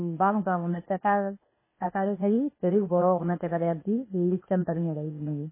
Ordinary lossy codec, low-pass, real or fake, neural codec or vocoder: MP3, 16 kbps; 3.6 kHz; fake; codec, 16 kHz, 0.8 kbps, ZipCodec